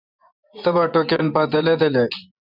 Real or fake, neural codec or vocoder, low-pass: fake; vocoder, 24 kHz, 100 mel bands, Vocos; 5.4 kHz